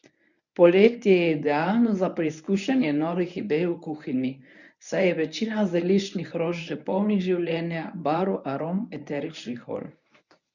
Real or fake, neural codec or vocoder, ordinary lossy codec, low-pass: fake; codec, 24 kHz, 0.9 kbps, WavTokenizer, medium speech release version 1; none; 7.2 kHz